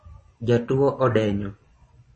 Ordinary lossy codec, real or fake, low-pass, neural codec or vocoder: MP3, 32 kbps; fake; 10.8 kHz; vocoder, 24 kHz, 100 mel bands, Vocos